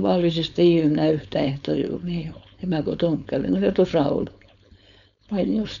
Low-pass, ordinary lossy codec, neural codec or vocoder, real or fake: 7.2 kHz; none; codec, 16 kHz, 4.8 kbps, FACodec; fake